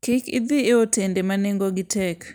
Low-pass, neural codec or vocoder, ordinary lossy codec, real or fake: none; none; none; real